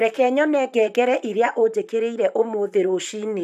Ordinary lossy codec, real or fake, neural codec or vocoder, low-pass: none; fake; vocoder, 44.1 kHz, 128 mel bands, Pupu-Vocoder; 14.4 kHz